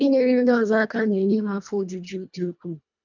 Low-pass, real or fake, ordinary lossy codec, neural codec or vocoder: 7.2 kHz; fake; none; codec, 24 kHz, 1.5 kbps, HILCodec